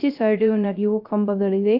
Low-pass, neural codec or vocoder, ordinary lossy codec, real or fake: 5.4 kHz; codec, 16 kHz, 0.3 kbps, FocalCodec; none; fake